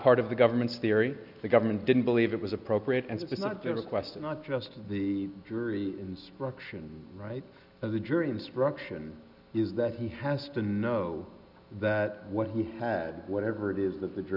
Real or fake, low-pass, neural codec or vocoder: real; 5.4 kHz; none